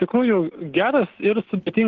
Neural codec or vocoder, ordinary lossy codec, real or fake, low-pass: none; Opus, 16 kbps; real; 7.2 kHz